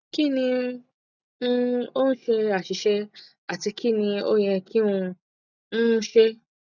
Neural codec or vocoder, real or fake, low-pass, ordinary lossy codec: none; real; 7.2 kHz; none